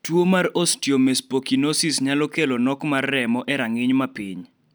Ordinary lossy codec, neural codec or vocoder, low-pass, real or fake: none; none; none; real